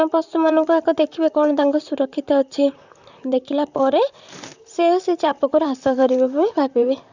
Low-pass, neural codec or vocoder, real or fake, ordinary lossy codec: 7.2 kHz; vocoder, 44.1 kHz, 128 mel bands, Pupu-Vocoder; fake; none